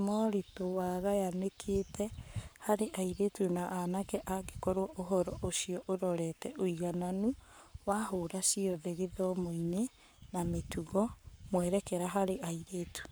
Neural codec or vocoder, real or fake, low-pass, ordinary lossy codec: codec, 44.1 kHz, 7.8 kbps, Pupu-Codec; fake; none; none